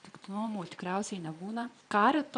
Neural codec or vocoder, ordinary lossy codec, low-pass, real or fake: vocoder, 22.05 kHz, 80 mel bands, Vocos; MP3, 96 kbps; 9.9 kHz; fake